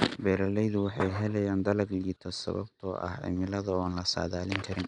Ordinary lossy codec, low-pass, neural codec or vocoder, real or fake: none; 10.8 kHz; none; real